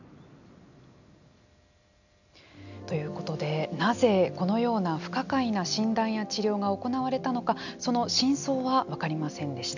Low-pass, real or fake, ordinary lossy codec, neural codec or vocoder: 7.2 kHz; real; none; none